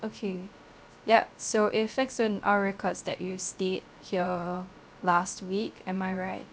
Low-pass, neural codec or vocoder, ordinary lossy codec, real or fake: none; codec, 16 kHz, 0.3 kbps, FocalCodec; none; fake